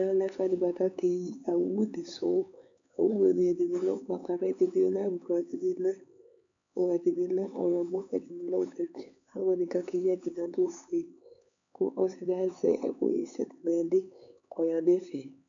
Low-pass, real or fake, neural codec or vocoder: 7.2 kHz; fake; codec, 16 kHz, 4 kbps, X-Codec, HuBERT features, trained on LibriSpeech